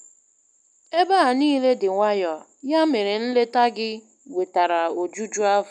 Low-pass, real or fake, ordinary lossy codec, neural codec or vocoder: 10.8 kHz; real; none; none